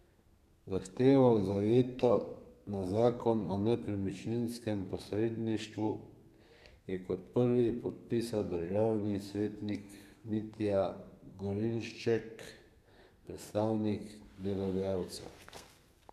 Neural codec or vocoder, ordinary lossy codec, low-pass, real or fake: codec, 32 kHz, 1.9 kbps, SNAC; none; 14.4 kHz; fake